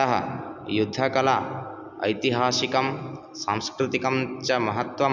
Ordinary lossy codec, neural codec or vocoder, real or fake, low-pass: none; none; real; 7.2 kHz